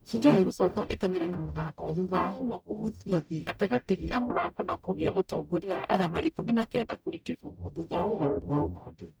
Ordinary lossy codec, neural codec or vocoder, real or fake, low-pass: none; codec, 44.1 kHz, 0.9 kbps, DAC; fake; none